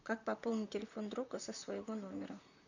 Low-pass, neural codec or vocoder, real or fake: 7.2 kHz; vocoder, 44.1 kHz, 128 mel bands, Pupu-Vocoder; fake